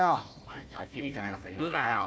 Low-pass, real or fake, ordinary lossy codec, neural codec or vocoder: none; fake; none; codec, 16 kHz, 1 kbps, FunCodec, trained on Chinese and English, 50 frames a second